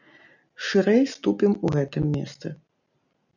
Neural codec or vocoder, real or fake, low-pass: none; real; 7.2 kHz